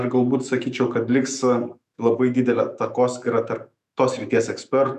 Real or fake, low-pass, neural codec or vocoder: fake; 14.4 kHz; autoencoder, 48 kHz, 128 numbers a frame, DAC-VAE, trained on Japanese speech